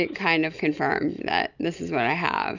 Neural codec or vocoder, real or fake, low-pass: none; real; 7.2 kHz